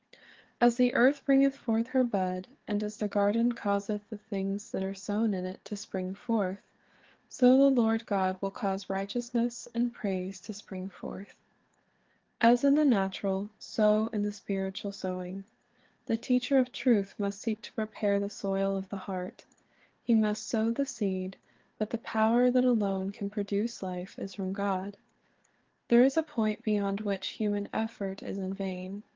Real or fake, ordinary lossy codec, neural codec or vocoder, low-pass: fake; Opus, 16 kbps; codec, 16 kHz, 4 kbps, FreqCodec, larger model; 7.2 kHz